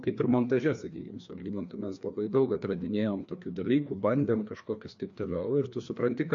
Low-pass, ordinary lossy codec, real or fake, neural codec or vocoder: 7.2 kHz; MP3, 48 kbps; fake; codec, 16 kHz, 2 kbps, FreqCodec, larger model